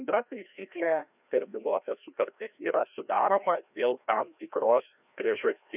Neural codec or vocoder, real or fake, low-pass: codec, 16 kHz, 1 kbps, FreqCodec, larger model; fake; 3.6 kHz